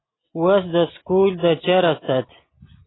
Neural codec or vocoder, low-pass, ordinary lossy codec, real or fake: none; 7.2 kHz; AAC, 16 kbps; real